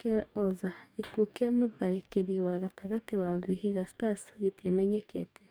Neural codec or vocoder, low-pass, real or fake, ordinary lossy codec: codec, 44.1 kHz, 2.6 kbps, DAC; none; fake; none